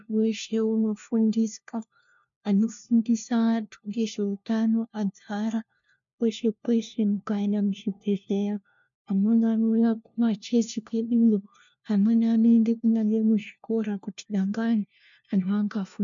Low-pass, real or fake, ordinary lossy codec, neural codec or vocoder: 7.2 kHz; fake; MP3, 64 kbps; codec, 16 kHz, 1 kbps, FunCodec, trained on LibriTTS, 50 frames a second